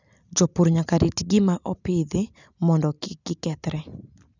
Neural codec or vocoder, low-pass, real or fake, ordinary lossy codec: none; 7.2 kHz; real; none